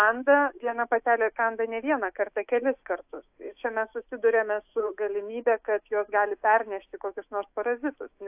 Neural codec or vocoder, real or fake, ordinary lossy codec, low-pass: autoencoder, 48 kHz, 128 numbers a frame, DAC-VAE, trained on Japanese speech; fake; AAC, 32 kbps; 3.6 kHz